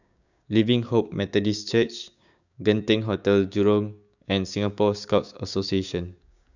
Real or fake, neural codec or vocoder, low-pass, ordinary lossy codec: fake; codec, 16 kHz, 6 kbps, DAC; 7.2 kHz; none